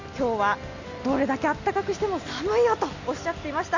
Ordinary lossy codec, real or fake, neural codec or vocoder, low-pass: Opus, 64 kbps; real; none; 7.2 kHz